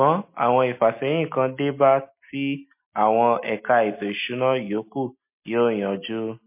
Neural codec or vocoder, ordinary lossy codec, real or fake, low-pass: none; MP3, 24 kbps; real; 3.6 kHz